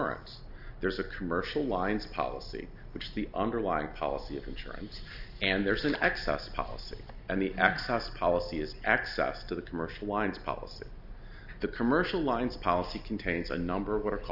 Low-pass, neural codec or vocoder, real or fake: 5.4 kHz; none; real